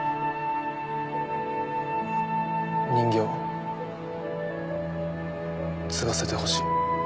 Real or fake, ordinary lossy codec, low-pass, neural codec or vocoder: real; none; none; none